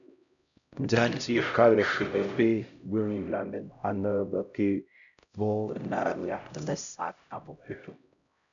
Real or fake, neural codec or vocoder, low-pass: fake; codec, 16 kHz, 0.5 kbps, X-Codec, HuBERT features, trained on LibriSpeech; 7.2 kHz